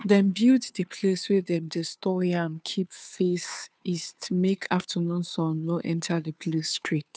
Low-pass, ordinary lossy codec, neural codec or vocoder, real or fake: none; none; codec, 16 kHz, 2 kbps, FunCodec, trained on Chinese and English, 25 frames a second; fake